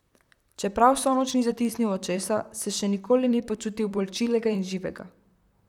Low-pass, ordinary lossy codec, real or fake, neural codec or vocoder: 19.8 kHz; none; fake; vocoder, 44.1 kHz, 128 mel bands, Pupu-Vocoder